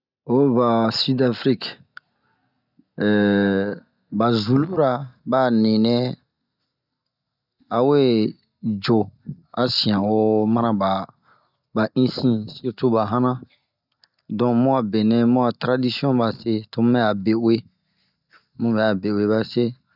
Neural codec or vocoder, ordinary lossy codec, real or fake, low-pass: none; none; real; 5.4 kHz